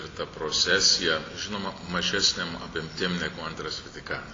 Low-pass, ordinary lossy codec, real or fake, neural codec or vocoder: 7.2 kHz; AAC, 32 kbps; real; none